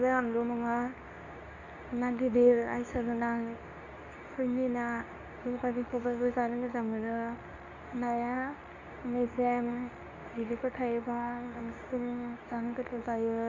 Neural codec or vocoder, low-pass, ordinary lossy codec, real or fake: codec, 24 kHz, 0.9 kbps, WavTokenizer, medium speech release version 2; 7.2 kHz; none; fake